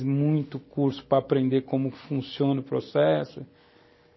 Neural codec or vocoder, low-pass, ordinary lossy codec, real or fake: none; 7.2 kHz; MP3, 24 kbps; real